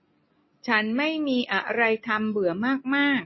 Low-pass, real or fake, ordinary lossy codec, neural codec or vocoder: 7.2 kHz; real; MP3, 24 kbps; none